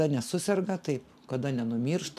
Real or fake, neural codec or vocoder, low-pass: real; none; 14.4 kHz